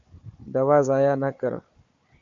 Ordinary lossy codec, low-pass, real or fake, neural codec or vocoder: AAC, 48 kbps; 7.2 kHz; fake; codec, 16 kHz, 4 kbps, FunCodec, trained on Chinese and English, 50 frames a second